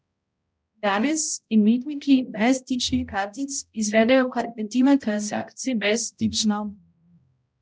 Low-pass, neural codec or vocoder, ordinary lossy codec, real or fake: none; codec, 16 kHz, 0.5 kbps, X-Codec, HuBERT features, trained on balanced general audio; none; fake